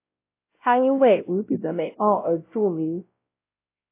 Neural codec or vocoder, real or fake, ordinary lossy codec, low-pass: codec, 16 kHz, 0.5 kbps, X-Codec, WavLM features, trained on Multilingual LibriSpeech; fake; AAC, 24 kbps; 3.6 kHz